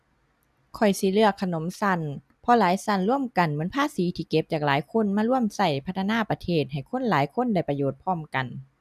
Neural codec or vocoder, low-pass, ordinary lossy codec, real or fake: none; 14.4 kHz; none; real